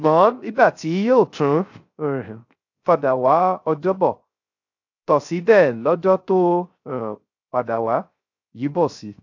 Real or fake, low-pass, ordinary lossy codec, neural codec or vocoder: fake; 7.2 kHz; AAC, 48 kbps; codec, 16 kHz, 0.3 kbps, FocalCodec